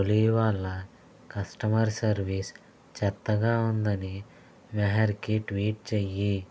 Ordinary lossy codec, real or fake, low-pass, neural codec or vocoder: none; real; none; none